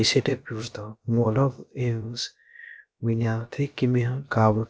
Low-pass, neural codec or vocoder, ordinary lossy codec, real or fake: none; codec, 16 kHz, about 1 kbps, DyCAST, with the encoder's durations; none; fake